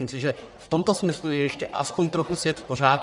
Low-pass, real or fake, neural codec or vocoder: 10.8 kHz; fake; codec, 44.1 kHz, 1.7 kbps, Pupu-Codec